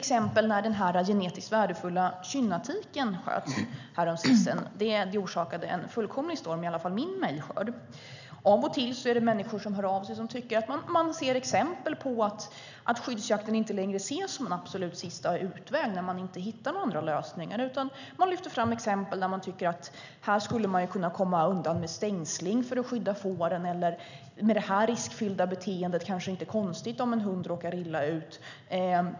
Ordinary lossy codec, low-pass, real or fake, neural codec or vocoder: none; 7.2 kHz; real; none